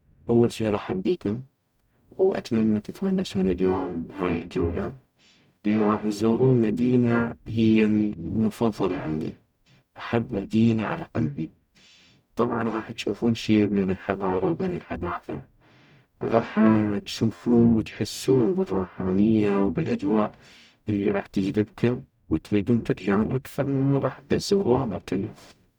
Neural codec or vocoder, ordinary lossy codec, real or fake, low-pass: codec, 44.1 kHz, 0.9 kbps, DAC; none; fake; 19.8 kHz